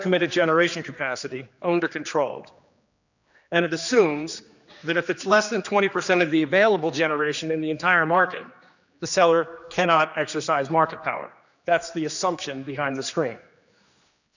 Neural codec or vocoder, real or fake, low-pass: codec, 16 kHz, 2 kbps, X-Codec, HuBERT features, trained on general audio; fake; 7.2 kHz